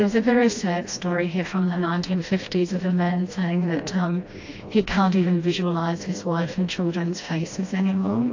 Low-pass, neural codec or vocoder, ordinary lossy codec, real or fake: 7.2 kHz; codec, 16 kHz, 1 kbps, FreqCodec, smaller model; AAC, 48 kbps; fake